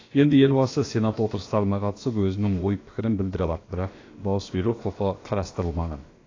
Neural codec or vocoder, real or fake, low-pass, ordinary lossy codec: codec, 16 kHz, about 1 kbps, DyCAST, with the encoder's durations; fake; 7.2 kHz; AAC, 32 kbps